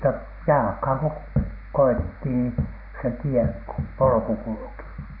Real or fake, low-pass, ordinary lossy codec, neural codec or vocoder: real; 5.4 kHz; none; none